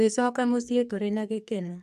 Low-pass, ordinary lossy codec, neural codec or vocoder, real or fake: 14.4 kHz; MP3, 96 kbps; codec, 44.1 kHz, 2.6 kbps, SNAC; fake